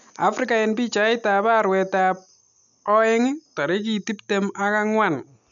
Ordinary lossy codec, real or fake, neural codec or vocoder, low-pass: none; real; none; 7.2 kHz